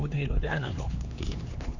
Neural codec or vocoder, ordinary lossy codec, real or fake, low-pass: codec, 16 kHz, 2 kbps, X-Codec, HuBERT features, trained on LibriSpeech; none; fake; 7.2 kHz